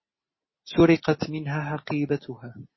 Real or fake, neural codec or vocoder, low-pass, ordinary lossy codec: real; none; 7.2 kHz; MP3, 24 kbps